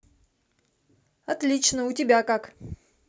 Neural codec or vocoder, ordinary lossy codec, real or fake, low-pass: none; none; real; none